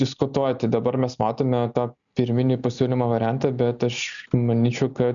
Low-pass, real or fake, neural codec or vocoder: 7.2 kHz; real; none